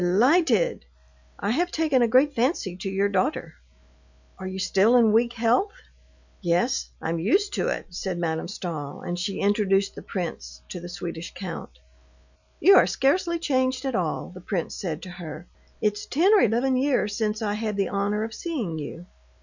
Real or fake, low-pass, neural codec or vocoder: real; 7.2 kHz; none